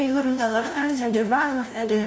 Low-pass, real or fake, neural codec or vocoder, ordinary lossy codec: none; fake; codec, 16 kHz, 0.5 kbps, FunCodec, trained on LibriTTS, 25 frames a second; none